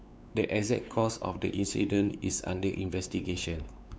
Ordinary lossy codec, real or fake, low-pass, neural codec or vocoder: none; fake; none; codec, 16 kHz, 4 kbps, X-Codec, WavLM features, trained on Multilingual LibriSpeech